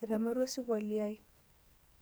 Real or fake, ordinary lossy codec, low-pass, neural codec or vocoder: fake; none; none; codec, 44.1 kHz, 2.6 kbps, SNAC